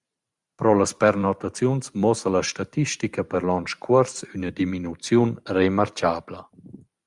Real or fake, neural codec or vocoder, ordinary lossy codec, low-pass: fake; vocoder, 44.1 kHz, 128 mel bands every 512 samples, BigVGAN v2; Opus, 64 kbps; 10.8 kHz